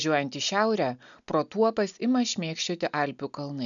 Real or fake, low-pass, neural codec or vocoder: real; 7.2 kHz; none